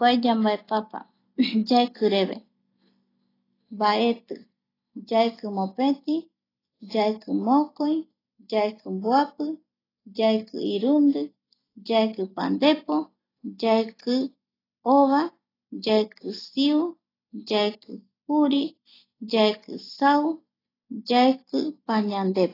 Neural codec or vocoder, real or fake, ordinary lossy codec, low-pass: none; real; AAC, 24 kbps; 5.4 kHz